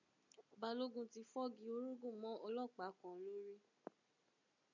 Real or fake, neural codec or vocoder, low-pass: real; none; 7.2 kHz